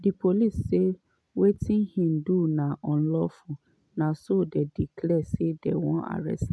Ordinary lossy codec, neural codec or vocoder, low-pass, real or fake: none; none; none; real